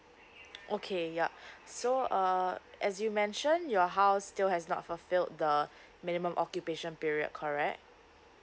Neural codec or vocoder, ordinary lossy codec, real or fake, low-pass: none; none; real; none